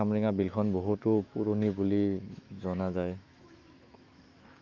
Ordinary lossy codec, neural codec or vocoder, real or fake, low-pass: Opus, 32 kbps; autoencoder, 48 kHz, 128 numbers a frame, DAC-VAE, trained on Japanese speech; fake; 7.2 kHz